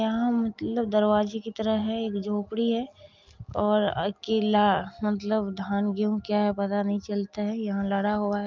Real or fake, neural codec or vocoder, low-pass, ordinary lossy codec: real; none; 7.2 kHz; Opus, 24 kbps